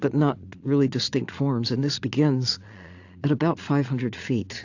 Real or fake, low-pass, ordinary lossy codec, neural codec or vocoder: fake; 7.2 kHz; AAC, 48 kbps; vocoder, 22.05 kHz, 80 mel bands, Vocos